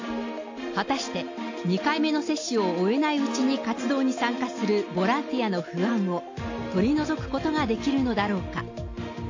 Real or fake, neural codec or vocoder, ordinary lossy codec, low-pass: real; none; AAC, 48 kbps; 7.2 kHz